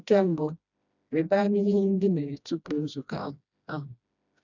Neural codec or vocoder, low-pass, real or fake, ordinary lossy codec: codec, 16 kHz, 1 kbps, FreqCodec, smaller model; 7.2 kHz; fake; none